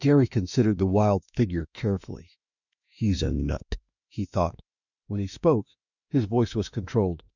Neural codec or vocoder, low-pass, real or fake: autoencoder, 48 kHz, 32 numbers a frame, DAC-VAE, trained on Japanese speech; 7.2 kHz; fake